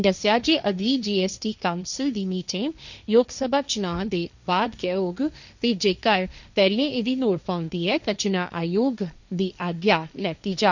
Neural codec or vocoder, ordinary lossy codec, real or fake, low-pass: codec, 16 kHz, 1.1 kbps, Voila-Tokenizer; none; fake; 7.2 kHz